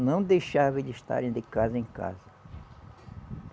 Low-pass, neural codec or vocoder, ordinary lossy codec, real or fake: none; none; none; real